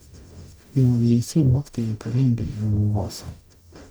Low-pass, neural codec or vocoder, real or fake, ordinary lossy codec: none; codec, 44.1 kHz, 0.9 kbps, DAC; fake; none